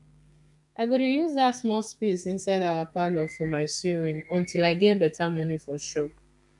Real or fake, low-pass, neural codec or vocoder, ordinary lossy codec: fake; 10.8 kHz; codec, 32 kHz, 1.9 kbps, SNAC; none